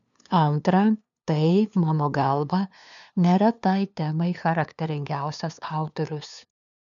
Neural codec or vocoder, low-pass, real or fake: codec, 16 kHz, 2 kbps, FunCodec, trained on LibriTTS, 25 frames a second; 7.2 kHz; fake